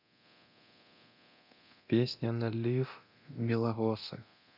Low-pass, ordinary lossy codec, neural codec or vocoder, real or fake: 5.4 kHz; none; codec, 24 kHz, 0.9 kbps, DualCodec; fake